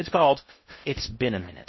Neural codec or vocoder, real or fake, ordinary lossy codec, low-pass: codec, 16 kHz in and 24 kHz out, 0.6 kbps, FocalCodec, streaming, 2048 codes; fake; MP3, 24 kbps; 7.2 kHz